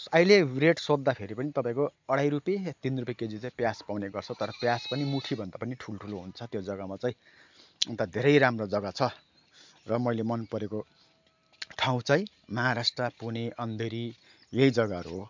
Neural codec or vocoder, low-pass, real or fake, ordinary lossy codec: none; 7.2 kHz; real; MP3, 64 kbps